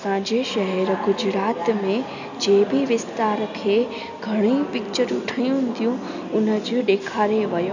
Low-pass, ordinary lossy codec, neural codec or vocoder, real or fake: 7.2 kHz; none; none; real